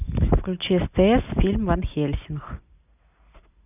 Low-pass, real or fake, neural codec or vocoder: 3.6 kHz; real; none